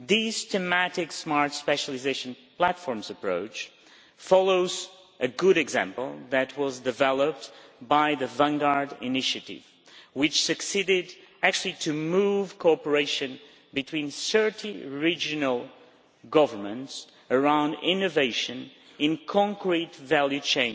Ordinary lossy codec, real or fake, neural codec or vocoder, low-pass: none; real; none; none